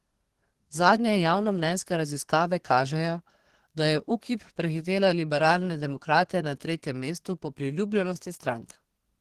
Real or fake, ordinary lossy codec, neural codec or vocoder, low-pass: fake; Opus, 16 kbps; codec, 32 kHz, 1.9 kbps, SNAC; 14.4 kHz